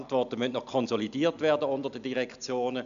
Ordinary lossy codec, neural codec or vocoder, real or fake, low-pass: none; none; real; 7.2 kHz